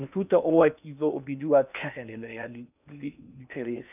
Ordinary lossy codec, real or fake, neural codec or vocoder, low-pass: none; fake; codec, 16 kHz in and 24 kHz out, 0.8 kbps, FocalCodec, streaming, 65536 codes; 3.6 kHz